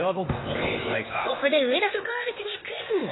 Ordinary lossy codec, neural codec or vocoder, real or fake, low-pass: AAC, 16 kbps; codec, 16 kHz, 0.8 kbps, ZipCodec; fake; 7.2 kHz